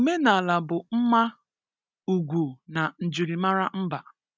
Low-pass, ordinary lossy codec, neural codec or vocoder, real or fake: none; none; none; real